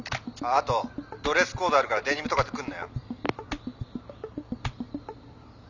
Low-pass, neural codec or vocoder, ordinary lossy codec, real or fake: 7.2 kHz; none; none; real